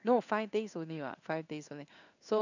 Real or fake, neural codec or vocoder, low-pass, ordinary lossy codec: fake; codec, 16 kHz in and 24 kHz out, 1 kbps, XY-Tokenizer; 7.2 kHz; none